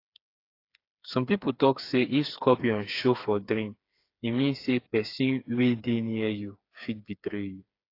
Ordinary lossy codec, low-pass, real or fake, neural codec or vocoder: AAC, 32 kbps; 5.4 kHz; fake; codec, 16 kHz, 4 kbps, FreqCodec, smaller model